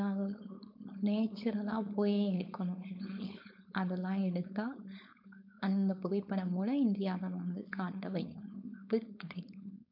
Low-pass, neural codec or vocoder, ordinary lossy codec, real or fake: 5.4 kHz; codec, 16 kHz, 4.8 kbps, FACodec; MP3, 48 kbps; fake